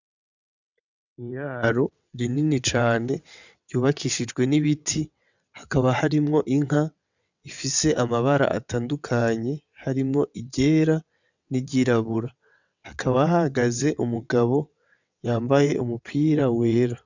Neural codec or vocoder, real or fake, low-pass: vocoder, 22.05 kHz, 80 mel bands, WaveNeXt; fake; 7.2 kHz